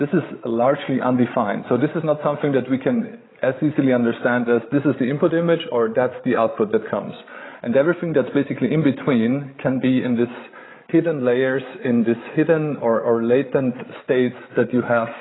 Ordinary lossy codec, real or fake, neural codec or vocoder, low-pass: AAC, 16 kbps; fake; codec, 16 kHz, 16 kbps, FreqCodec, larger model; 7.2 kHz